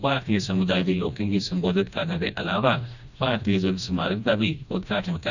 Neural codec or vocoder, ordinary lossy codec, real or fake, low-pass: codec, 16 kHz, 1 kbps, FreqCodec, smaller model; none; fake; 7.2 kHz